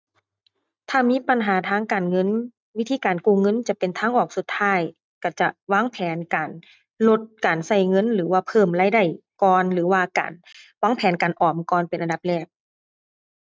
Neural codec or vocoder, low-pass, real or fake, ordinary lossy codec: none; none; real; none